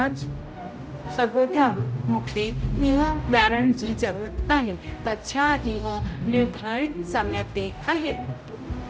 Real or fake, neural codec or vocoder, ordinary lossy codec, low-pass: fake; codec, 16 kHz, 0.5 kbps, X-Codec, HuBERT features, trained on general audio; none; none